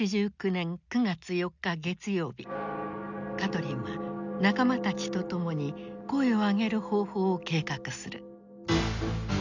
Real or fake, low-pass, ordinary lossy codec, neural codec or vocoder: real; 7.2 kHz; none; none